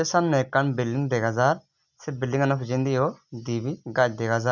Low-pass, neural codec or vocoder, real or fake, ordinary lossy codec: 7.2 kHz; none; real; none